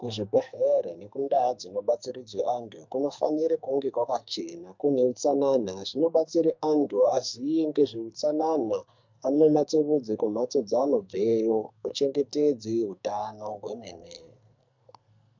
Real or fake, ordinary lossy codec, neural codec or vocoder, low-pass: fake; MP3, 64 kbps; codec, 44.1 kHz, 2.6 kbps, SNAC; 7.2 kHz